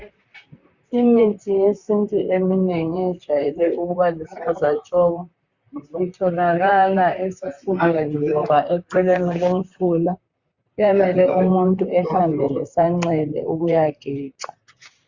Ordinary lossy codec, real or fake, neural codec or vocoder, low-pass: Opus, 64 kbps; fake; vocoder, 44.1 kHz, 128 mel bands, Pupu-Vocoder; 7.2 kHz